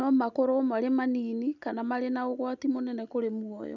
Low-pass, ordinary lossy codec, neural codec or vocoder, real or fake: 7.2 kHz; none; none; real